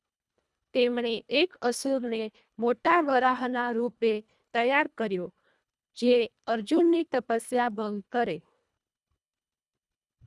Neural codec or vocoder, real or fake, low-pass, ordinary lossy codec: codec, 24 kHz, 1.5 kbps, HILCodec; fake; none; none